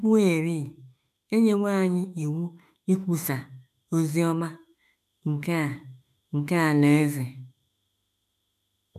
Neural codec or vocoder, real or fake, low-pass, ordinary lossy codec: autoencoder, 48 kHz, 32 numbers a frame, DAC-VAE, trained on Japanese speech; fake; 14.4 kHz; none